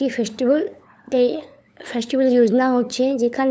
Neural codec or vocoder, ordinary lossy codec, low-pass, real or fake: codec, 16 kHz, 8 kbps, FunCodec, trained on LibriTTS, 25 frames a second; none; none; fake